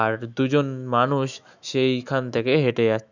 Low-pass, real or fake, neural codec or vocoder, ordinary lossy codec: 7.2 kHz; fake; autoencoder, 48 kHz, 128 numbers a frame, DAC-VAE, trained on Japanese speech; none